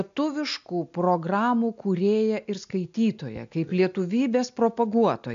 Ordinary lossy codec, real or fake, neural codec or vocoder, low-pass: MP3, 64 kbps; real; none; 7.2 kHz